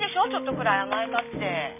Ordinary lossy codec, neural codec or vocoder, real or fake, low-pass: none; none; real; 3.6 kHz